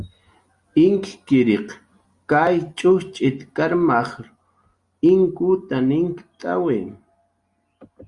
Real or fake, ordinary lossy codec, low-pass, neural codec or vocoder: real; Opus, 64 kbps; 10.8 kHz; none